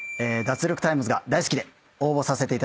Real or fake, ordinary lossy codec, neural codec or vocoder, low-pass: real; none; none; none